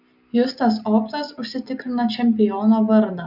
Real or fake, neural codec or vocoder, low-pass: real; none; 5.4 kHz